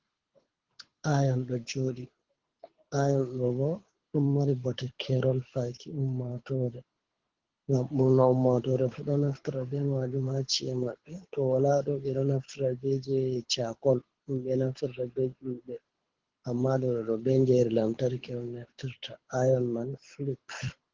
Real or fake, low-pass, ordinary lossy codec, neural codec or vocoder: fake; 7.2 kHz; Opus, 16 kbps; codec, 24 kHz, 6 kbps, HILCodec